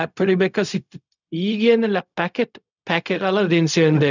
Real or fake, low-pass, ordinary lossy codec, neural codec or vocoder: fake; 7.2 kHz; none; codec, 16 kHz, 0.4 kbps, LongCat-Audio-Codec